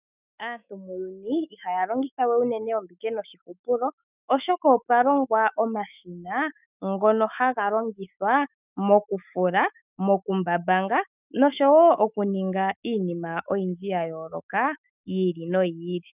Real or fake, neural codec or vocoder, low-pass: fake; autoencoder, 48 kHz, 128 numbers a frame, DAC-VAE, trained on Japanese speech; 3.6 kHz